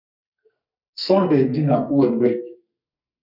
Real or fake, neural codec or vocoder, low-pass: fake; codec, 44.1 kHz, 2.6 kbps, SNAC; 5.4 kHz